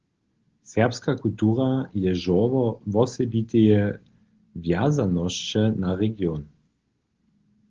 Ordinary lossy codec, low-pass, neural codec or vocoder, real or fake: Opus, 16 kbps; 7.2 kHz; none; real